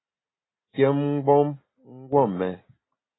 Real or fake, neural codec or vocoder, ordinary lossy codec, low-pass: real; none; AAC, 16 kbps; 7.2 kHz